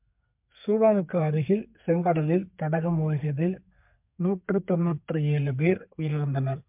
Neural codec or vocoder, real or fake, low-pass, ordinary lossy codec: codec, 32 kHz, 1.9 kbps, SNAC; fake; 3.6 kHz; MP3, 32 kbps